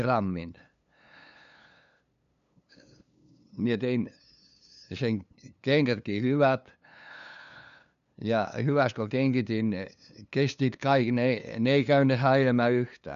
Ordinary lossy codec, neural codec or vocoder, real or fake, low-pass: none; codec, 16 kHz, 2 kbps, FunCodec, trained on LibriTTS, 25 frames a second; fake; 7.2 kHz